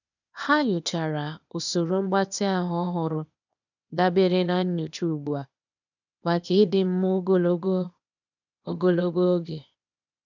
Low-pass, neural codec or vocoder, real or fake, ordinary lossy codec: 7.2 kHz; codec, 16 kHz, 0.8 kbps, ZipCodec; fake; none